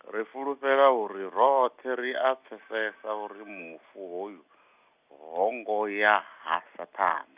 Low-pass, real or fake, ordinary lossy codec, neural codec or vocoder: 3.6 kHz; real; Opus, 64 kbps; none